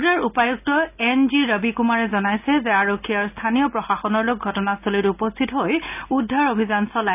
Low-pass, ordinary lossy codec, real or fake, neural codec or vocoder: 3.6 kHz; none; real; none